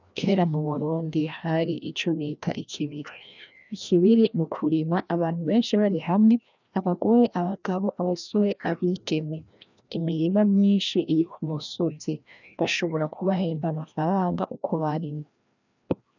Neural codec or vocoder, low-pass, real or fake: codec, 16 kHz, 1 kbps, FreqCodec, larger model; 7.2 kHz; fake